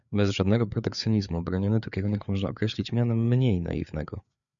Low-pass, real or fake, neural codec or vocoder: 7.2 kHz; fake; codec, 16 kHz, 4 kbps, FreqCodec, larger model